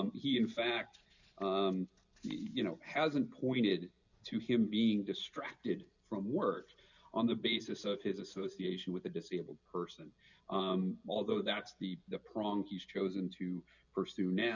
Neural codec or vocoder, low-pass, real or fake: none; 7.2 kHz; real